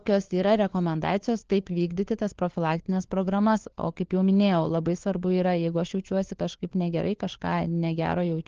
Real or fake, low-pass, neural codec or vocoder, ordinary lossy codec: fake; 7.2 kHz; codec, 16 kHz, 4 kbps, FunCodec, trained on LibriTTS, 50 frames a second; Opus, 16 kbps